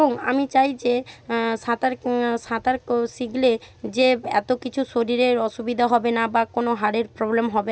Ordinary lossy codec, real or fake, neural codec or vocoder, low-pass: none; real; none; none